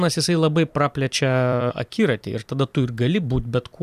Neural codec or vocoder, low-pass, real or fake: vocoder, 44.1 kHz, 128 mel bands every 256 samples, BigVGAN v2; 14.4 kHz; fake